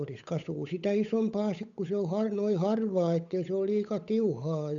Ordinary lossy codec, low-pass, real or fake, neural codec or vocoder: none; 7.2 kHz; fake; codec, 16 kHz, 8 kbps, FunCodec, trained on Chinese and English, 25 frames a second